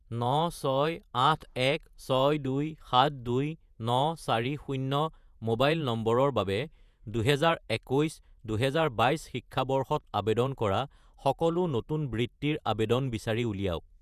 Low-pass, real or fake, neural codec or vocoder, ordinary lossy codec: 14.4 kHz; fake; vocoder, 48 kHz, 128 mel bands, Vocos; none